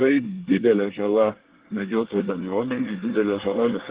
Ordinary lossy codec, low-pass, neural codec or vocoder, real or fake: Opus, 16 kbps; 3.6 kHz; codec, 24 kHz, 1 kbps, SNAC; fake